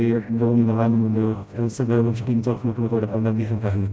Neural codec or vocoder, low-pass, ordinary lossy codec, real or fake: codec, 16 kHz, 0.5 kbps, FreqCodec, smaller model; none; none; fake